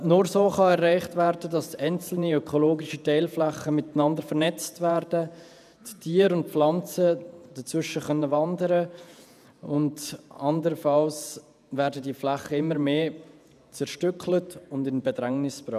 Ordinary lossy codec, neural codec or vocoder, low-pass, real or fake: none; vocoder, 48 kHz, 128 mel bands, Vocos; 14.4 kHz; fake